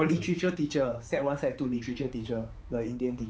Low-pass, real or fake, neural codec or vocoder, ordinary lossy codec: none; fake; codec, 16 kHz, 4 kbps, X-Codec, HuBERT features, trained on balanced general audio; none